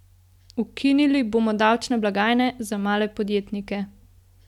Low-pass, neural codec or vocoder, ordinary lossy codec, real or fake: 19.8 kHz; none; none; real